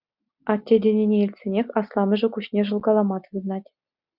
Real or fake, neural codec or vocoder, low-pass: real; none; 5.4 kHz